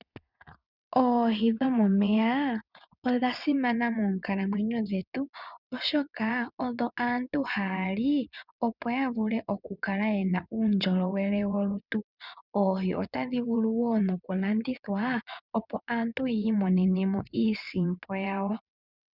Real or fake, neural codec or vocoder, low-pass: fake; vocoder, 22.05 kHz, 80 mel bands, WaveNeXt; 5.4 kHz